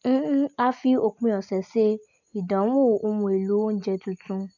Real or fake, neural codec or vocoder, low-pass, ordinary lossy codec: real; none; 7.2 kHz; none